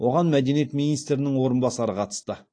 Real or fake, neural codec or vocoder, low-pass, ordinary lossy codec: real; none; 9.9 kHz; AAC, 48 kbps